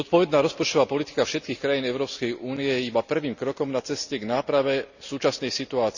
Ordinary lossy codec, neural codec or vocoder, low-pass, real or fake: none; none; 7.2 kHz; real